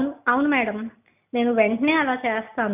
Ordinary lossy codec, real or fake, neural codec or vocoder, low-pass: none; real; none; 3.6 kHz